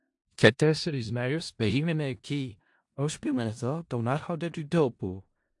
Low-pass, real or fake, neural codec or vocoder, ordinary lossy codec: 10.8 kHz; fake; codec, 16 kHz in and 24 kHz out, 0.4 kbps, LongCat-Audio-Codec, four codebook decoder; AAC, 64 kbps